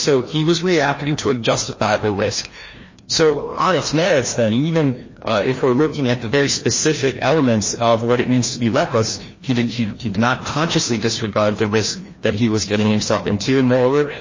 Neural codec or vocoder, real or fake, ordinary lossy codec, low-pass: codec, 16 kHz, 1 kbps, FreqCodec, larger model; fake; MP3, 32 kbps; 7.2 kHz